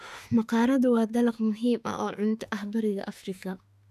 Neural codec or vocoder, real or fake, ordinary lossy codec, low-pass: autoencoder, 48 kHz, 32 numbers a frame, DAC-VAE, trained on Japanese speech; fake; none; 14.4 kHz